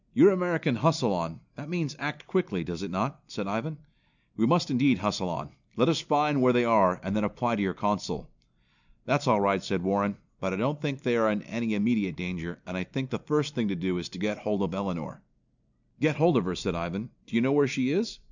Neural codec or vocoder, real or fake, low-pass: none; real; 7.2 kHz